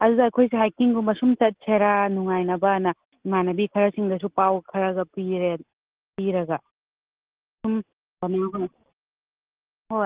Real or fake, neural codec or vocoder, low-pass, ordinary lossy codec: real; none; 3.6 kHz; Opus, 16 kbps